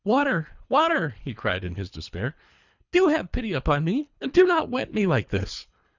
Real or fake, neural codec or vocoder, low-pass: fake; codec, 24 kHz, 3 kbps, HILCodec; 7.2 kHz